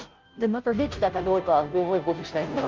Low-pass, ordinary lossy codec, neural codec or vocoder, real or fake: 7.2 kHz; Opus, 32 kbps; codec, 16 kHz, 0.5 kbps, FunCodec, trained on Chinese and English, 25 frames a second; fake